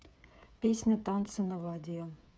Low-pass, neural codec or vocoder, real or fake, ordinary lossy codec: none; codec, 16 kHz, 8 kbps, FreqCodec, smaller model; fake; none